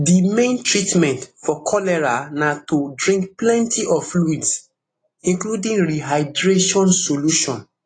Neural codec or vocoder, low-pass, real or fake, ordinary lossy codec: none; 9.9 kHz; real; AAC, 32 kbps